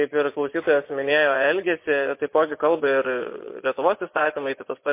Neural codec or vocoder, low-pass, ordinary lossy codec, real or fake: vocoder, 24 kHz, 100 mel bands, Vocos; 3.6 kHz; MP3, 24 kbps; fake